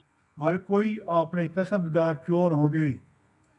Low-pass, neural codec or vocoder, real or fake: 10.8 kHz; codec, 24 kHz, 0.9 kbps, WavTokenizer, medium music audio release; fake